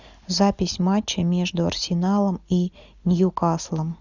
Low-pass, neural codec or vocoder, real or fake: 7.2 kHz; none; real